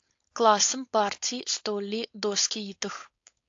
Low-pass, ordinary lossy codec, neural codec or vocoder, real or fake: 7.2 kHz; AAC, 48 kbps; codec, 16 kHz, 4.8 kbps, FACodec; fake